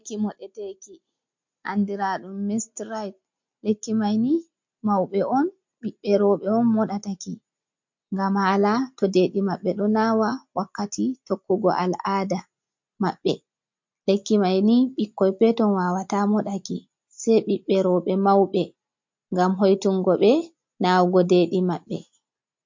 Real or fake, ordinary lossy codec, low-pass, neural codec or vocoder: real; MP3, 48 kbps; 7.2 kHz; none